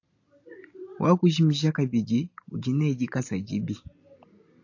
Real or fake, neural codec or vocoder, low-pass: real; none; 7.2 kHz